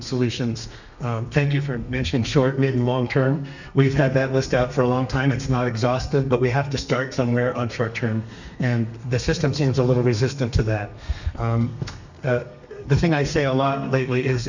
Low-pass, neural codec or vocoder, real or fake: 7.2 kHz; codec, 32 kHz, 1.9 kbps, SNAC; fake